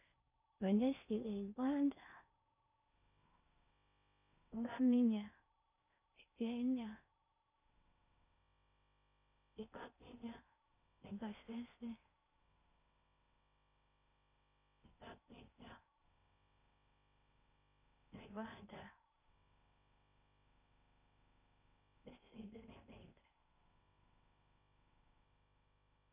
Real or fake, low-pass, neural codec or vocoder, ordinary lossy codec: fake; 3.6 kHz; codec, 16 kHz in and 24 kHz out, 0.6 kbps, FocalCodec, streaming, 4096 codes; none